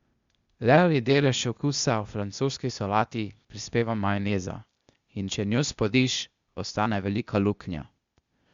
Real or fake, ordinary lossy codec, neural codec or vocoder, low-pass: fake; Opus, 64 kbps; codec, 16 kHz, 0.8 kbps, ZipCodec; 7.2 kHz